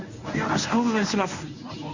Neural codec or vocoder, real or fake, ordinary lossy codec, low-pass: codec, 24 kHz, 0.9 kbps, WavTokenizer, medium speech release version 1; fake; none; 7.2 kHz